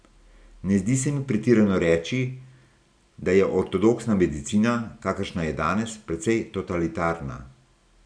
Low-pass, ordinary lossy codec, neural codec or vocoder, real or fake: 9.9 kHz; none; none; real